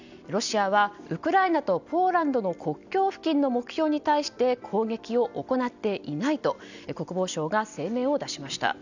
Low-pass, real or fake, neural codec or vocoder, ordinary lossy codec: 7.2 kHz; real; none; none